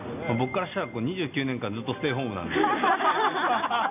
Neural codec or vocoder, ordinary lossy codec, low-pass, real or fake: none; none; 3.6 kHz; real